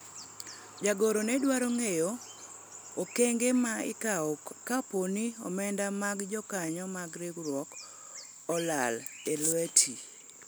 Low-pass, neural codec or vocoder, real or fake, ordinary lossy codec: none; none; real; none